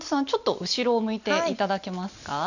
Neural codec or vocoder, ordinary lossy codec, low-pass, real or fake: none; none; 7.2 kHz; real